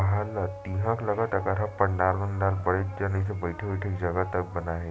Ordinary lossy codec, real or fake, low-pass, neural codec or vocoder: none; real; none; none